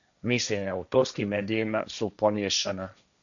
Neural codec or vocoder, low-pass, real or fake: codec, 16 kHz, 1.1 kbps, Voila-Tokenizer; 7.2 kHz; fake